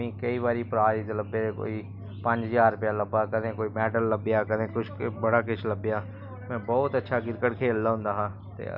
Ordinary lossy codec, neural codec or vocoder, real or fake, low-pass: none; none; real; 5.4 kHz